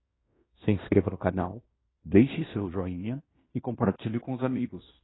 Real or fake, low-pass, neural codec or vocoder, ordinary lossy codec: fake; 7.2 kHz; codec, 16 kHz in and 24 kHz out, 0.9 kbps, LongCat-Audio-Codec, fine tuned four codebook decoder; AAC, 16 kbps